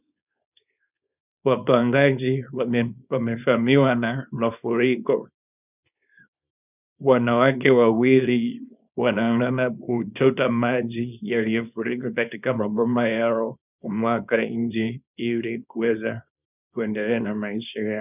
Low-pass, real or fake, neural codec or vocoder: 3.6 kHz; fake; codec, 24 kHz, 0.9 kbps, WavTokenizer, small release